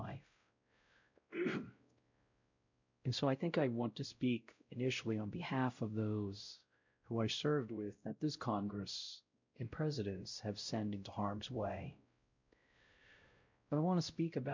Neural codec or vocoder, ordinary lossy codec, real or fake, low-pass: codec, 16 kHz, 0.5 kbps, X-Codec, WavLM features, trained on Multilingual LibriSpeech; MP3, 64 kbps; fake; 7.2 kHz